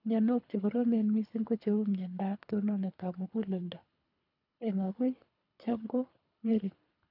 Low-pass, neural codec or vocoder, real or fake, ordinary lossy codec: 5.4 kHz; codec, 24 kHz, 3 kbps, HILCodec; fake; none